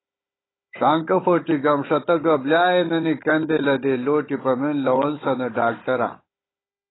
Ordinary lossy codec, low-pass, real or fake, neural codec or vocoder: AAC, 16 kbps; 7.2 kHz; fake; codec, 16 kHz, 16 kbps, FunCodec, trained on Chinese and English, 50 frames a second